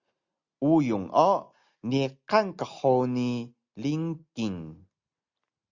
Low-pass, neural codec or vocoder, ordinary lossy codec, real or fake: 7.2 kHz; none; Opus, 64 kbps; real